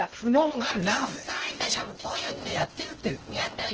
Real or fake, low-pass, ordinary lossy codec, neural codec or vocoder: fake; 7.2 kHz; Opus, 16 kbps; codec, 16 kHz in and 24 kHz out, 0.6 kbps, FocalCodec, streaming, 2048 codes